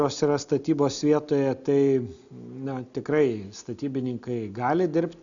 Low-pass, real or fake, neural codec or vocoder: 7.2 kHz; real; none